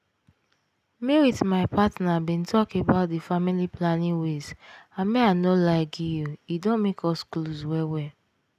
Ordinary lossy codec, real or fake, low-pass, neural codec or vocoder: none; real; 14.4 kHz; none